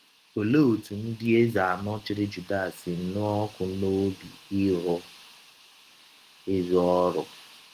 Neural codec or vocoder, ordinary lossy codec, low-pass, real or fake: autoencoder, 48 kHz, 128 numbers a frame, DAC-VAE, trained on Japanese speech; Opus, 24 kbps; 14.4 kHz; fake